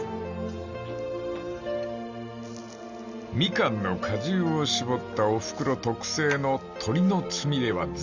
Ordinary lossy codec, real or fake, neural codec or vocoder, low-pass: Opus, 64 kbps; real; none; 7.2 kHz